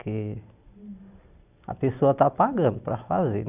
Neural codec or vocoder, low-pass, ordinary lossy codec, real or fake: none; 3.6 kHz; none; real